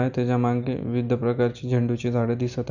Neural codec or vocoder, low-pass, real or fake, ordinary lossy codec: none; 7.2 kHz; real; none